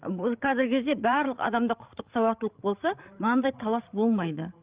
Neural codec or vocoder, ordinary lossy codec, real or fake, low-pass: codec, 16 kHz, 16 kbps, FreqCodec, larger model; Opus, 24 kbps; fake; 3.6 kHz